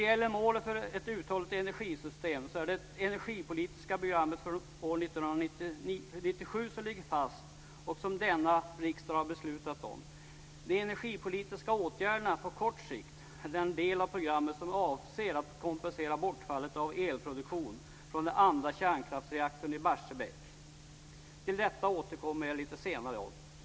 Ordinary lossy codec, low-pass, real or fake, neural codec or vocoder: none; none; real; none